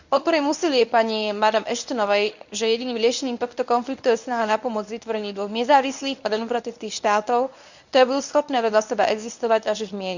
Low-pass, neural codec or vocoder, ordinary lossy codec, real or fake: 7.2 kHz; codec, 24 kHz, 0.9 kbps, WavTokenizer, medium speech release version 1; none; fake